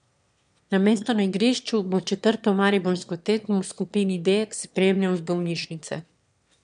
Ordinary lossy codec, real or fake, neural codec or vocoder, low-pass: none; fake; autoencoder, 22.05 kHz, a latent of 192 numbers a frame, VITS, trained on one speaker; 9.9 kHz